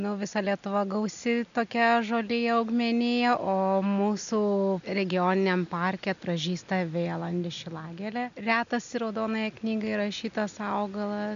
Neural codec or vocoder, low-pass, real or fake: none; 7.2 kHz; real